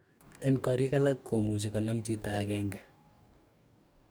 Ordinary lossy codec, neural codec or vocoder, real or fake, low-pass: none; codec, 44.1 kHz, 2.6 kbps, DAC; fake; none